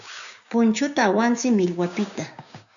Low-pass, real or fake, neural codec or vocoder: 7.2 kHz; fake; codec, 16 kHz, 6 kbps, DAC